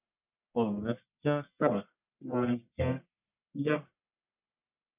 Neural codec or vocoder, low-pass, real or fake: codec, 44.1 kHz, 1.7 kbps, Pupu-Codec; 3.6 kHz; fake